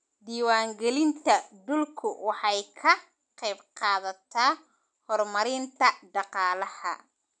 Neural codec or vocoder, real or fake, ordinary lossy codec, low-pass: none; real; none; 9.9 kHz